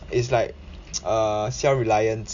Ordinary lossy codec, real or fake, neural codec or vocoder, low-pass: none; real; none; 7.2 kHz